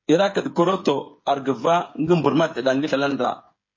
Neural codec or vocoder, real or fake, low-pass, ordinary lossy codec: codec, 16 kHz, 8 kbps, FreqCodec, smaller model; fake; 7.2 kHz; MP3, 32 kbps